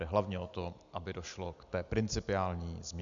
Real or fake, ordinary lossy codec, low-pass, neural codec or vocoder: real; MP3, 96 kbps; 7.2 kHz; none